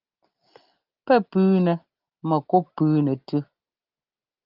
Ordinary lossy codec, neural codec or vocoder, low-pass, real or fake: Opus, 32 kbps; none; 5.4 kHz; real